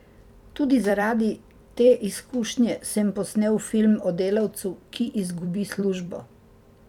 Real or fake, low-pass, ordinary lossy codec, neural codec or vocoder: fake; 19.8 kHz; none; vocoder, 44.1 kHz, 128 mel bands every 256 samples, BigVGAN v2